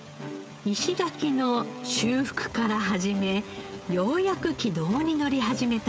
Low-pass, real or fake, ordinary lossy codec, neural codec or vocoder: none; fake; none; codec, 16 kHz, 16 kbps, FreqCodec, smaller model